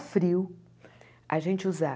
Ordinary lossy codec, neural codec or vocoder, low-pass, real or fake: none; none; none; real